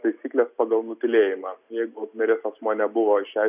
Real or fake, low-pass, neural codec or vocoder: real; 3.6 kHz; none